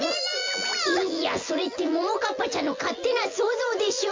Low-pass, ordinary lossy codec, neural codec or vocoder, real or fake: 7.2 kHz; MP3, 48 kbps; vocoder, 24 kHz, 100 mel bands, Vocos; fake